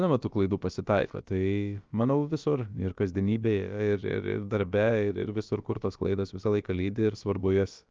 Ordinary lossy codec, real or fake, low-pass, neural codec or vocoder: Opus, 24 kbps; fake; 7.2 kHz; codec, 16 kHz, about 1 kbps, DyCAST, with the encoder's durations